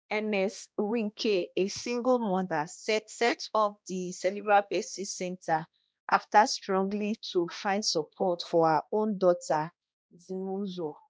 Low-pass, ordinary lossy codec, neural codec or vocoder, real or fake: none; none; codec, 16 kHz, 1 kbps, X-Codec, HuBERT features, trained on balanced general audio; fake